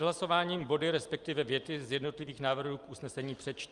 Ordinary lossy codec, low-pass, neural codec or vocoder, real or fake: Opus, 32 kbps; 10.8 kHz; none; real